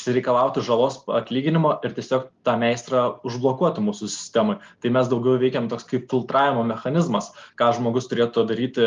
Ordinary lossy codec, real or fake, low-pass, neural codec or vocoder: Opus, 32 kbps; real; 7.2 kHz; none